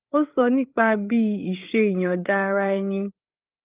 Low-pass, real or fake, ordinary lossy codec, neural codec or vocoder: 3.6 kHz; fake; Opus, 32 kbps; codec, 16 kHz, 8 kbps, FreqCodec, larger model